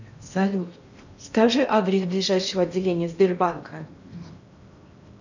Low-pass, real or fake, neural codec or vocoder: 7.2 kHz; fake; codec, 16 kHz in and 24 kHz out, 0.8 kbps, FocalCodec, streaming, 65536 codes